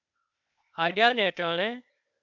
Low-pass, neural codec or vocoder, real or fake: 7.2 kHz; codec, 16 kHz, 0.8 kbps, ZipCodec; fake